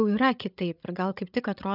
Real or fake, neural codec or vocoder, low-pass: fake; codec, 16 kHz, 8 kbps, FreqCodec, larger model; 5.4 kHz